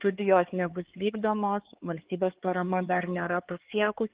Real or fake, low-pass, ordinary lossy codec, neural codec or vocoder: fake; 3.6 kHz; Opus, 16 kbps; codec, 16 kHz, 4 kbps, X-Codec, HuBERT features, trained on LibriSpeech